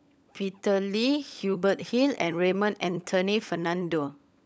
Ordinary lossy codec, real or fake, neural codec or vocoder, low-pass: none; fake; codec, 16 kHz, 16 kbps, FunCodec, trained on LibriTTS, 50 frames a second; none